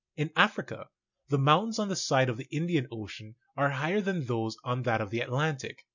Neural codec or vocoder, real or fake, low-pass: none; real; 7.2 kHz